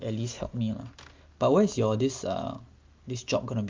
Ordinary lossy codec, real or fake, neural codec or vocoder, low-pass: Opus, 32 kbps; real; none; 7.2 kHz